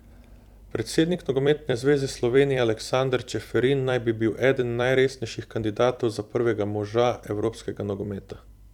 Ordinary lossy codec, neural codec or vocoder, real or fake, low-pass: none; vocoder, 44.1 kHz, 128 mel bands every 256 samples, BigVGAN v2; fake; 19.8 kHz